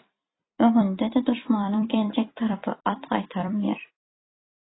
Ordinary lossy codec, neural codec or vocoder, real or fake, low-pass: AAC, 16 kbps; vocoder, 44.1 kHz, 128 mel bands every 256 samples, BigVGAN v2; fake; 7.2 kHz